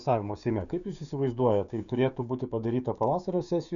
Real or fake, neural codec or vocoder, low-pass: fake; codec, 16 kHz, 6 kbps, DAC; 7.2 kHz